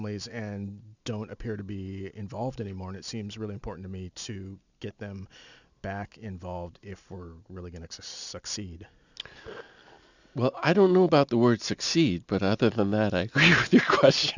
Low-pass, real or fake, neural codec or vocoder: 7.2 kHz; real; none